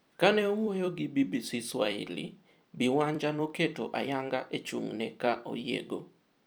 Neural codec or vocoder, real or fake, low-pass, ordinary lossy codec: vocoder, 44.1 kHz, 128 mel bands every 256 samples, BigVGAN v2; fake; none; none